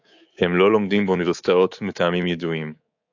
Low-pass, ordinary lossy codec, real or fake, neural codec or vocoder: 7.2 kHz; AAC, 48 kbps; fake; codec, 24 kHz, 3.1 kbps, DualCodec